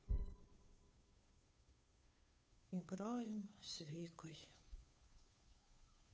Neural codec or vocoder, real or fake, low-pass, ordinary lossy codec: codec, 16 kHz, 2 kbps, FunCodec, trained on Chinese and English, 25 frames a second; fake; none; none